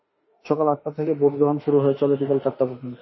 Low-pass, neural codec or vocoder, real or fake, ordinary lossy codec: 7.2 kHz; autoencoder, 48 kHz, 32 numbers a frame, DAC-VAE, trained on Japanese speech; fake; MP3, 24 kbps